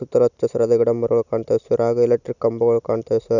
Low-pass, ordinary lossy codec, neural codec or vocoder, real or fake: 7.2 kHz; none; none; real